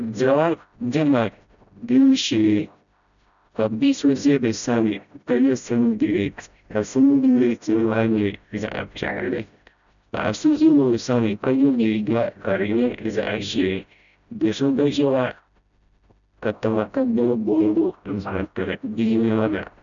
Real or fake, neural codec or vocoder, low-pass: fake; codec, 16 kHz, 0.5 kbps, FreqCodec, smaller model; 7.2 kHz